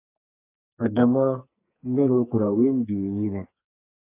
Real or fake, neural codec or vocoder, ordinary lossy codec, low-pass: fake; codec, 44.1 kHz, 2.6 kbps, SNAC; AAC, 24 kbps; 3.6 kHz